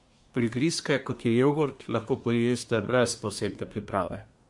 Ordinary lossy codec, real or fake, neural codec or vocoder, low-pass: MP3, 64 kbps; fake; codec, 24 kHz, 1 kbps, SNAC; 10.8 kHz